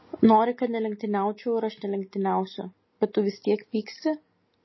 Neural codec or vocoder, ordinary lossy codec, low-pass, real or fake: none; MP3, 24 kbps; 7.2 kHz; real